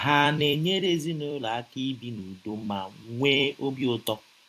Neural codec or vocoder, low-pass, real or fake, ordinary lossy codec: vocoder, 44.1 kHz, 128 mel bands every 256 samples, BigVGAN v2; 14.4 kHz; fake; AAC, 64 kbps